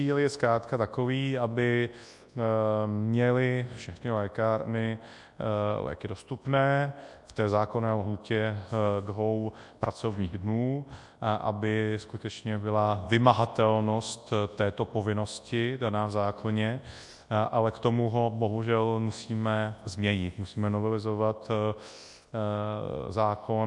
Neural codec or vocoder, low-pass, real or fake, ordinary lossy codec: codec, 24 kHz, 0.9 kbps, WavTokenizer, large speech release; 10.8 kHz; fake; AAC, 64 kbps